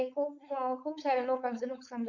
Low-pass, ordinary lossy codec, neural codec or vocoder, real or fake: 7.2 kHz; none; codec, 16 kHz, 4.8 kbps, FACodec; fake